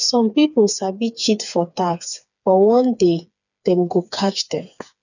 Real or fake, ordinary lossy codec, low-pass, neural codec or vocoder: fake; none; 7.2 kHz; codec, 16 kHz, 4 kbps, FreqCodec, smaller model